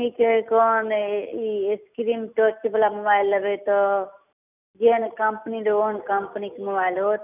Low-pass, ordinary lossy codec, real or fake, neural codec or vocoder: 3.6 kHz; none; real; none